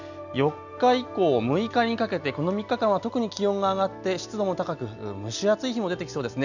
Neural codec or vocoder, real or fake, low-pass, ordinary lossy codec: none; real; 7.2 kHz; none